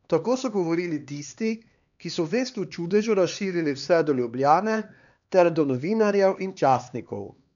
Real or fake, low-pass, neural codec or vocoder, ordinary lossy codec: fake; 7.2 kHz; codec, 16 kHz, 2 kbps, X-Codec, HuBERT features, trained on LibriSpeech; none